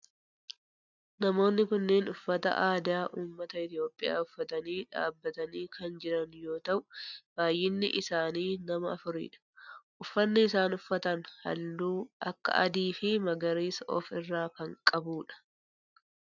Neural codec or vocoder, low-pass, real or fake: none; 7.2 kHz; real